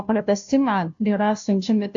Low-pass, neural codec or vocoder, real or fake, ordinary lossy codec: 7.2 kHz; codec, 16 kHz, 0.5 kbps, FunCodec, trained on Chinese and English, 25 frames a second; fake; AAC, 64 kbps